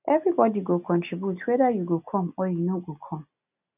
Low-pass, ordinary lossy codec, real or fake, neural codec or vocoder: 3.6 kHz; none; real; none